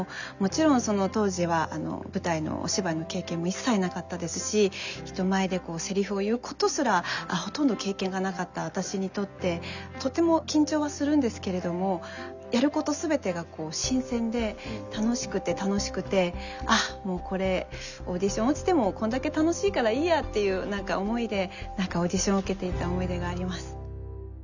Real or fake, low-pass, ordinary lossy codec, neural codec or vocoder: real; 7.2 kHz; none; none